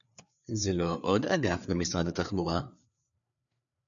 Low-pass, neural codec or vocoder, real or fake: 7.2 kHz; codec, 16 kHz, 4 kbps, FreqCodec, larger model; fake